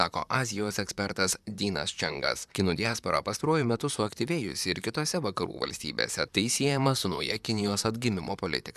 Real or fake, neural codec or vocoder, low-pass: fake; vocoder, 44.1 kHz, 128 mel bands, Pupu-Vocoder; 14.4 kHz